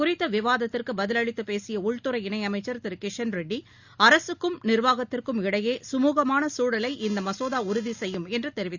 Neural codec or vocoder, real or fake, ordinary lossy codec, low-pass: none; real; Opus, 64 kbps; 7.2 kHz